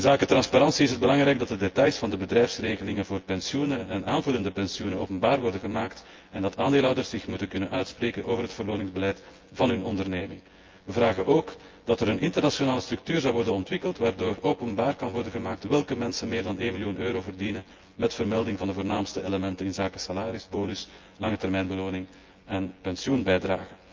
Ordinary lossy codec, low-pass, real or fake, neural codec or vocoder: Opus, 32 kbps; 7.2 kHz; fake; vocoder, 24 kHz, 100 mel bands, Vocos